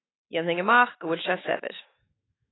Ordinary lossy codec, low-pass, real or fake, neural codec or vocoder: AAC, 16 kbps; 7.2 kHz; fake; autoencoder, 48 kHz, 128 numbers a frame, DAC-VAE, trained on Japanese speech